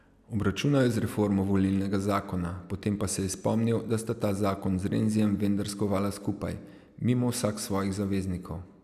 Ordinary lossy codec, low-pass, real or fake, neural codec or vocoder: none; 14.4 kHz; real; none